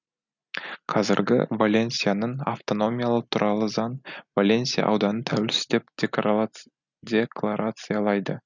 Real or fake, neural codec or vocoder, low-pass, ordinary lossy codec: real; none; 7.2 kHz; none